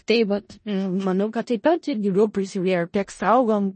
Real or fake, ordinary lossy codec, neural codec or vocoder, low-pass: fake; MP3, 32 kbps; codec, 16 kHz in and 24 kHz out, 0.4 kbps, LongCat-Audio-Codec, four codebook decoder; 10.8 kHz